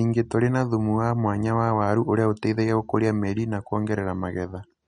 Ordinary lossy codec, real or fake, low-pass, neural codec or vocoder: MP3, 48 kbps; real; 9.9 kHz; none